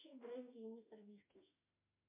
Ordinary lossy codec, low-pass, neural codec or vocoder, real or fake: MP3, 32 kbps; 3.6 kHz; autoencoder, 48 kHz, 32 numbers a frame, DAC-VAE, trained on Japanese speech; fake